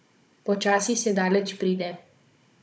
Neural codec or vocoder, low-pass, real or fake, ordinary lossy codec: codec, 16 kHz, 16 kbps, FunCodec, trained on Chinese and English, 50 frames a second; none; fake; none